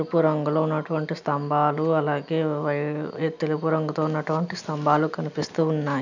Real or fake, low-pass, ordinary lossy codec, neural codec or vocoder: real; 7.2 kHz; none; none